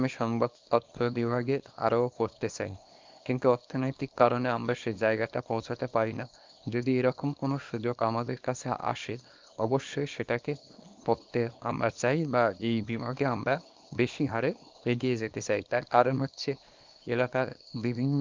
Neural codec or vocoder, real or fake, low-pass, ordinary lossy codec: codec, 24 kHz, 0.9 kbps, WavTokenizer, small release; fake; 7.2 kHz; Opus, 24 kbps